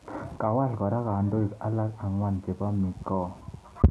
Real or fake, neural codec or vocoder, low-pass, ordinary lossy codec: real; none; none; none